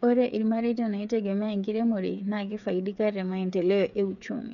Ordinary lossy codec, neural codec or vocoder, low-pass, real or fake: none; codec, 16 kHz, 8 kbps, FreqCodec, smaller model; 7.2 kHz; fake